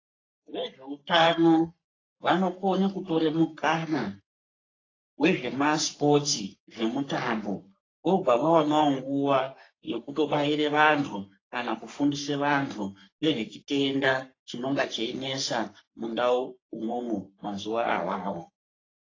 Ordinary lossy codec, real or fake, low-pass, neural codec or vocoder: AAC, 32 kbps; fake; 7.2 kHz; codec, 44.1 kHz, 3.4 kbps, Pupu-Codec